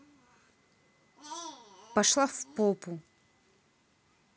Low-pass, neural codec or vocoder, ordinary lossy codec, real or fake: none; none; none; real